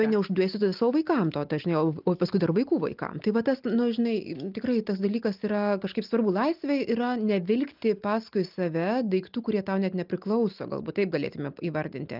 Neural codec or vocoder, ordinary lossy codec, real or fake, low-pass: none; Opus, 24 kbps; real; 5.4 kHz